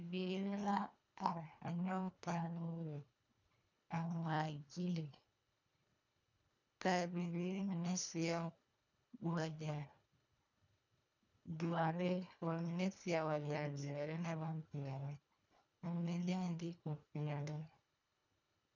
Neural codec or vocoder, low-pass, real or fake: codec, 24 kHz, 1.5 kbps, HILCodec; 7.2 kHz; fake